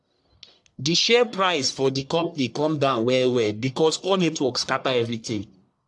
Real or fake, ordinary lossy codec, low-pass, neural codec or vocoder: fake; AAC, 64 kbps; 10.8 kHz; codec, 44.1 kHz, 1.7 kbps, Pupu-Codec